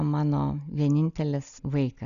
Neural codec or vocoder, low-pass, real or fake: none; 7.2 kHz; real